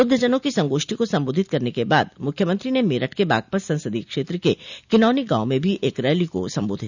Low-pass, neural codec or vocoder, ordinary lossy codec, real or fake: 7.2 kHz; none; none; real